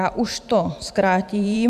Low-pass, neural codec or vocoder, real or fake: 14.4 kHz; vocoder, 44.1 kHz, 128 mel bands every 512 samples, BigVGAN v2; fake